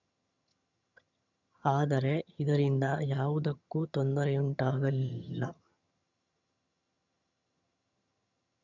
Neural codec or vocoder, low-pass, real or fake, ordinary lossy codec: vocoder, 22.05 kHz, 80 mel bands, HiFi-GAN; 7.2 kHz; fake; none